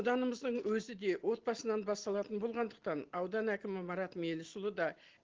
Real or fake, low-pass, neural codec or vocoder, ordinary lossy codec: real; 7.2 kHz; none; Opus, 16 kbps